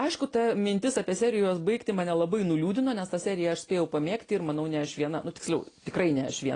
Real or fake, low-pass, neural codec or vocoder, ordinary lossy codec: real; 9.9 kHz; none; AAC, 32 kbps